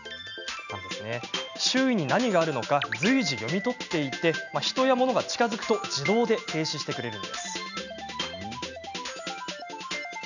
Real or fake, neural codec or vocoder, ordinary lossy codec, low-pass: real; none; none; 7.2 kHz